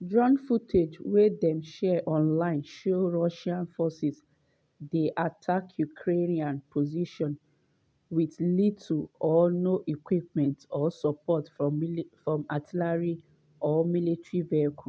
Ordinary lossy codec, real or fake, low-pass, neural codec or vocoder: none; real; none; none